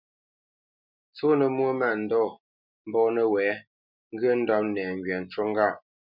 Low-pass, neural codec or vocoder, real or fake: 5.4 kHz; none; real